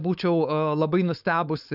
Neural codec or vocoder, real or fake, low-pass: codec, 16 kHz, 4.8 kbps, FACodec; fake; 5.4 kHz